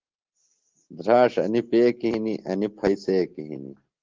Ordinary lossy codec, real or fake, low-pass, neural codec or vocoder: Opus, 16 kbps; real; 7.2 kHz; none